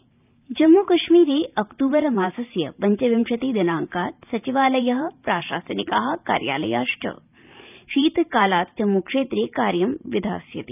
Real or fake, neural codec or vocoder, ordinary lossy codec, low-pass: fake; vocoder, 44.1 kHz, 128 mel bands every 512 samples, BigVGAN v2; none; 3.6 kHz